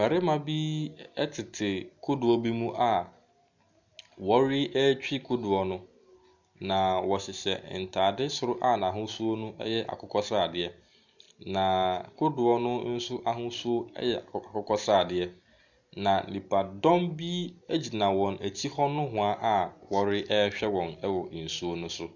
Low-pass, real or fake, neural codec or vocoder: 7.2 kHz; real; none